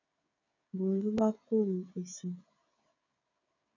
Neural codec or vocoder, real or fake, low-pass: codec, 16 kHz, 16 kbps, FreqCodec, smaller model; fake; 7.2 kHz